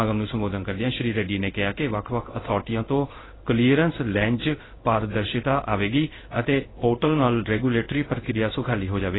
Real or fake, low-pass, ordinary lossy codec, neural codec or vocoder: fake; 7.2 kHz; AAC, 16 kbps; codec, 16 kHz in and 24 kHz out, 1 kbps, XY-Tokenizer